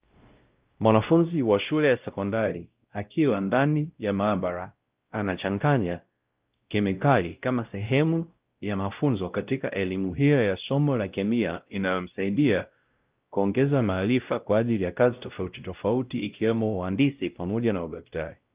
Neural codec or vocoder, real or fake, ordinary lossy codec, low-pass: codec, 16 kHz, 0.5 kbps, X-Codec, WavLM features, trained on Multilingual LibriSpeech; fake; Opus, 24 kbps; 3.6 kHz